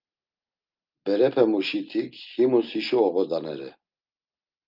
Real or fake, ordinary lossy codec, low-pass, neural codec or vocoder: real; Opus, 24 kbps; 5.4 kHz; none